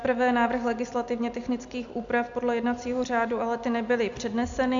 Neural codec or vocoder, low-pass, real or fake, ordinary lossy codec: none; 7.2 kHz; real; MP3, 64 kbps